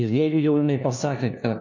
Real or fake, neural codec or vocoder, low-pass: fake; codec, 16 kHz, 1 kbps, FunCodec, trained on LibriTTS, 50 frames a second; 7.2 kHz